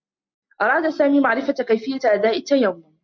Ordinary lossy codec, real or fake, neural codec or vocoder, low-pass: MP3, 64 kbps; real; none; 7.2 kHz